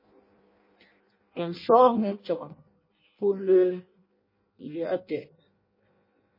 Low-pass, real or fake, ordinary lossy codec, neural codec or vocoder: 5.4 kHz; fake; MP3, 24 kbps; codec, 16 kHz in and 24 kHz out, 0.6 kbps, FireRedTTS-2 codec